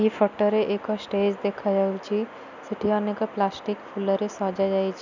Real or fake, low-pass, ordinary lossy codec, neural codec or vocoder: real; 7.2 kHz; none; none